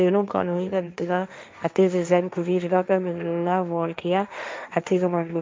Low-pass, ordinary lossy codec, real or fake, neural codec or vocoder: none; none; fake; codec, 16 kHz, 1.1 kbps, Voila-Tokenizer